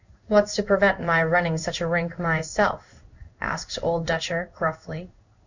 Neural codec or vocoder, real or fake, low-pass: codec, 16 kHz in and 24 kHz out, 1 kbps, XY-Tokenizer; fake; 7.2 kHz